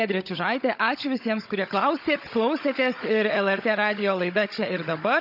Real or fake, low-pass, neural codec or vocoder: fake; 5.4 kHz; codec, 16 kHz, 16 kbps, FunCodec, trained on Chinese and English, 50 frames a second